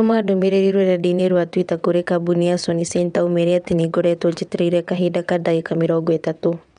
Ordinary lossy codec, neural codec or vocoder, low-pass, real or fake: none; vocoder, 22.05 kHz, 80 mel bands, WaveNeXt; 9.9 kHz; fake